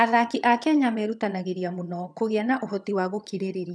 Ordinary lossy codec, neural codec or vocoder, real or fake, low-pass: none; vocoder, 22.05 kHz, 80 mel bands, HiFi-GAN; fake; none